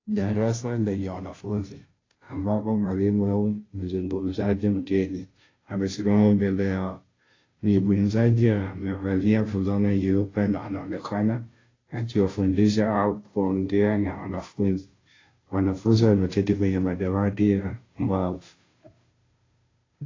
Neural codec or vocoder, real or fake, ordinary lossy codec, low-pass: codec, 16 kHz, 0.5 kbps, FunCodec, trained on Chinese and English, 25 frames a second; fake; AAC, 32 kbps; 7.2 kHz